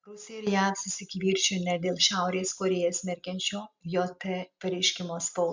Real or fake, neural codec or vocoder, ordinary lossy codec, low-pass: real; none; MP3, 64 kbps; 7.2 kHz